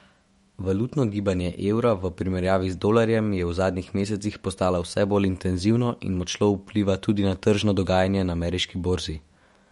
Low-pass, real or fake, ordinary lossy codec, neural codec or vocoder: 19.8 kHz; fake; MP3, 48 kbps; autoencoder, 48 kHz, 128 numbers a frame, DAC-VAE, trained on Japanese speech